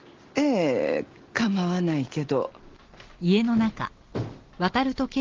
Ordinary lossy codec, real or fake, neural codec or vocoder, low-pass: Opus, 16 kbps; real; none; 7.2 kHz